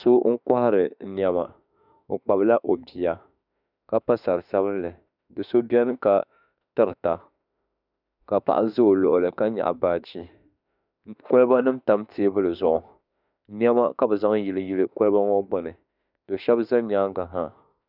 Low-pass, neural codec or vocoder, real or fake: 5.4 kHz; autoencoder, 48 kHz, 32 numbers a frame, DAC-VAE, trained on Japanese speech; fake